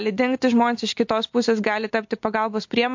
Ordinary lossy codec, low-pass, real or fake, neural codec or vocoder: MP3, 48 kbps; 7.2 kHz; real; none